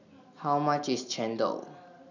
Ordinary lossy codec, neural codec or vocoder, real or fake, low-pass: none; none; real; 7.2 kHz